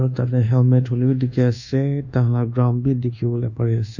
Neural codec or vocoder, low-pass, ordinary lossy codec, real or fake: codec, 24 kHz, 1.2 kbps, DualCodec; 7.2 kHz; none; fake